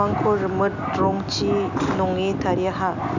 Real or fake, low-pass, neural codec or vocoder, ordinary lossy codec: real; 7.2 kHz; none; none